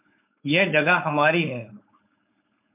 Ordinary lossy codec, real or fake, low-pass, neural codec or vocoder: MP3, 32 kbps; fake; 3.6 kHz; codec, 16 kHz, 4.8 kbps, FACodec